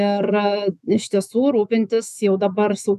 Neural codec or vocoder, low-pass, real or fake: autoencoder, 48 kHz, 128 numbers a frame, DAC-VAE, trained on Japanese speech; 14.4 kHz; fake